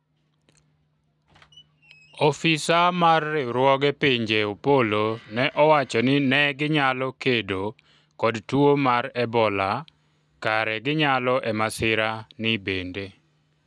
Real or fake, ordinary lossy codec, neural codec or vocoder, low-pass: real; none; none; none